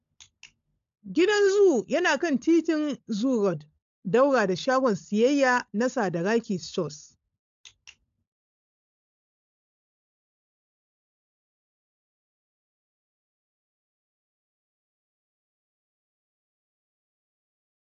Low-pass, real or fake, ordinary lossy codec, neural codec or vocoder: 7.2 kHz; fake; AAC, 64 kbps; codec, 16 kHz, 16 kbps, FunCodec, trained on LibriTTS, 50 frames a second